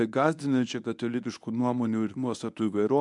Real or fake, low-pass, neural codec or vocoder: fake; 10.8 kHz; codec, 24 kHz, 0.9 kbps, WavTokenizer, medium speech release version 1